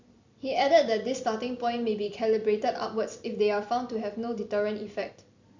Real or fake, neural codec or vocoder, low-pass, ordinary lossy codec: fake; vocoder, 44.1 kHz, 128 mel bands every 256 samples, BigVGAN v2; 7.2 kHz; MP3, 64 kbps